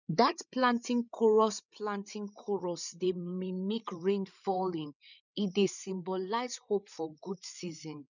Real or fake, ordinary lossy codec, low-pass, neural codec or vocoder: fake; none; 7.2 kHz; codec, 16 kHz, 8 kbps, FreqCodec, larger model